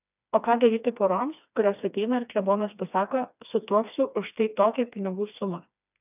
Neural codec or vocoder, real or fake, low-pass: codec, 16 kHz, 2 kbps, FreqCodec, smaller model; fake; 3.6 kHz